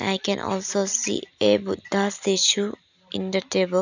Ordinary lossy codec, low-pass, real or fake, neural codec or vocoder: none; 7.2 kHz; real; none